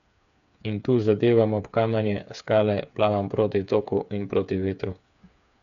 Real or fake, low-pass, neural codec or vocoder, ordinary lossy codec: fake; 7.2 kHz; codec, 16 kHz, 8 kbps, FreqCodec, smaller model; none